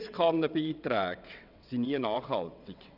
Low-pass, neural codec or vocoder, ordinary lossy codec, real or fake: 5.4 kHz; vocoder, 22.05 kHz, 80 mel bands, WaveNeXt; none; fake